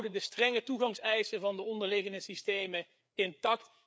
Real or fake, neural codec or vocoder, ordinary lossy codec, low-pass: fake; codec, 16 kHz, 4 kbps, FreqCodec, larger model; none; none